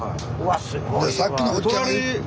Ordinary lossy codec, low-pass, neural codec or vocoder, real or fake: none; none; none; real